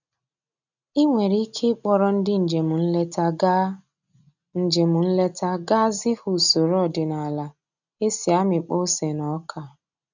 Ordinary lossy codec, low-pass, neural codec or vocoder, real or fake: none; 7.2 kHz; none; real